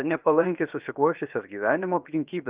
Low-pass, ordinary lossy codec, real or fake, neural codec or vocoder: 3.6 kHz; Opus, 24 kbps; fake; codec, 16 kHz, 0.7 kbps, FocalCodec